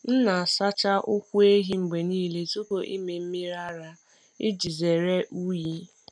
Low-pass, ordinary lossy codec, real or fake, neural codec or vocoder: 9.9 kHz; none; real; none